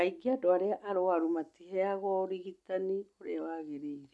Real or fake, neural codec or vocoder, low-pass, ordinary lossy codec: real; none; none; none